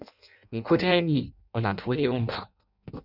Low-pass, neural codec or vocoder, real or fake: 5.4 kHz; codec, 16 kHz in and 24 kHz out, 0.6 kbps, FireRedTTS-2 codec; fake